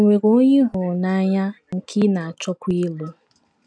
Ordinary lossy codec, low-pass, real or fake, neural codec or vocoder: none; 9.9 kHz; real; none